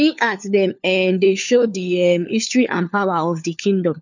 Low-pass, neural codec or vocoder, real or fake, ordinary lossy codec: 7.2 kHz; codec, 16 kHz, 4 kbps, FunCodec, trained on LibriTTS, 50 frames a second; fake; none